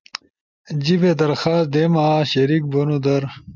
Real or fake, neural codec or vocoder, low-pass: real; none; 7.2 kHz